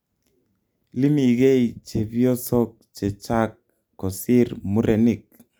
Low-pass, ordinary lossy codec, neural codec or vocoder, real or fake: none; none; none; real